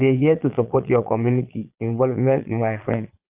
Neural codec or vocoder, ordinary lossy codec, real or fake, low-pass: vocoder, 22.05 kHz, 80 mel bands, Vocos; Opus, 32 kbps; fake; 3.6 kHz